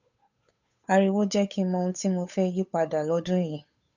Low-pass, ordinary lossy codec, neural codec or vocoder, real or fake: 7.2 kHz; none; codec, 16 kHz, 8 kbps, FunCodec, trained on Chinese and English, 25 frames a second; fake